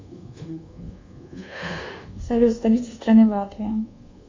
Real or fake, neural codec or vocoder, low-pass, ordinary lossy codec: fake; codec, 24 kHz, 1.2 kbps, DualCodec; 7.2 kHz; MP3, 64 kbps